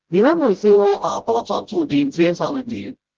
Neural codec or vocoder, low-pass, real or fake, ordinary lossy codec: codec, 16 kHz, 0.5 kbps, FreqCodec, smaller model; 7.2 kHz; fake; Opus, 16 kbps